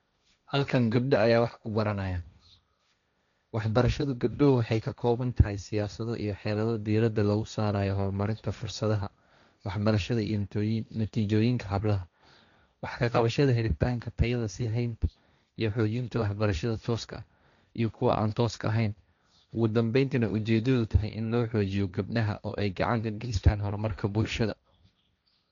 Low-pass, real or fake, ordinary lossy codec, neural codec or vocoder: 7.2 kHz; fake; none; codec, 16 kHz, 1.1 kbps, Voila-Tokenizer